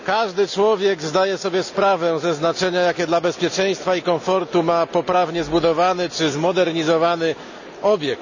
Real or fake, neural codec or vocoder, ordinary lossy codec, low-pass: real; none; none; 7.2 kHz